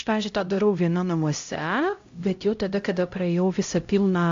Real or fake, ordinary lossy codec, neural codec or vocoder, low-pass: fake; AAC, 48 kbps; codec, 16 kHz, 0.5 kbps, X-Codec, HuBERT features, trained on LibriSpeech; 7.2 kHz